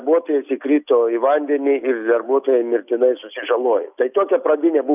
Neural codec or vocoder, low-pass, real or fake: none; 3.6 kHz; real